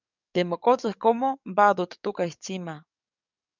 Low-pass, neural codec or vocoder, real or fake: 7.2 kHz; codec, 44.1 kHz, 7.8 kbps, DAC; fake